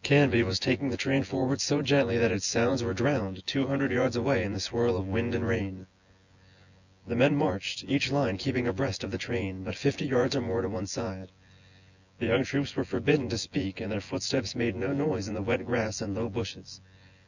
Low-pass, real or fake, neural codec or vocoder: 7.2 kHz; fake; vocoder, 24 kHz, 100 mel bands, Vocos